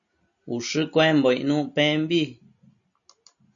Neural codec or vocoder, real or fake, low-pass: none; real; 7.2 kHz